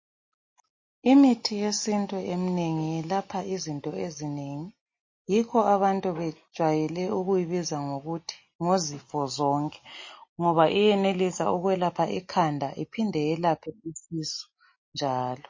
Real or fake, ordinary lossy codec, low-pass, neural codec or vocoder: real; MP3, 32 kbps; 7.2 kHz; none